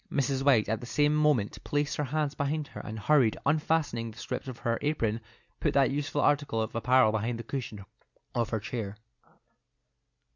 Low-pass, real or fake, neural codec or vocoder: 7.2 kHz; real; none